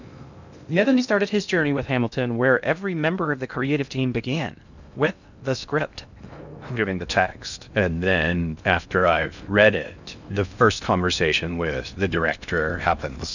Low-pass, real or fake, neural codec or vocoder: 7.2 kHz; fake; codec, 16 kHz in and 24 kHz out, 0.6 kbps, FocalCodec, streaming, 2048 codes